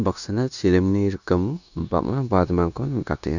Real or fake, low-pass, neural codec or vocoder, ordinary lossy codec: fake; 7.2 kHz; codec, 16 kHz, 0.9 kbps, LongCat-Audio-Codec; none